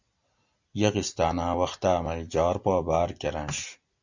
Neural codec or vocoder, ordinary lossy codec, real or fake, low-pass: none; Opus, 64 kbps; real; 7.2 kHz